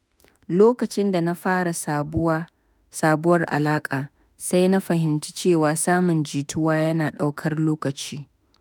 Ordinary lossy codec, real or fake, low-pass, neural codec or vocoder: none; fake; none; autoencoder, 48 kHz, 32 numbers a frame, DAC-VAE, trained on Japanese speech